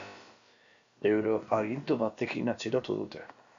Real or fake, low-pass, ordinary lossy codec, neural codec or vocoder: fake; 7.2 kHz; MP3, 64 kbps; codec, 16 kHz, about 1 kbps, DyCAST, with the encoder's durations